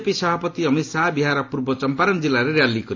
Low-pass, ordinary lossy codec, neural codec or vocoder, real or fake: 7.2 kHz; AAC, 48 kbps; none; real